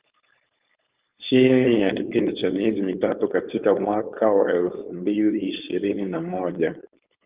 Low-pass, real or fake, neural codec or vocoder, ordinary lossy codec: 3.6 kHz; fake; codec, 16 kHz, 4.8 kbps, FACodec; Opus, 32 kbps